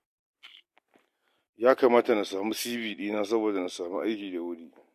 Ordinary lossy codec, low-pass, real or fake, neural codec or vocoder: MP3, 64 kbps; 14.4 kHz; real; none